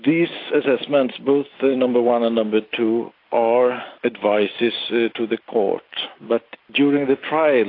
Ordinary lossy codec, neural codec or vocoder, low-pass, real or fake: AAC, 32 kbps; none; 5.4 kHz; real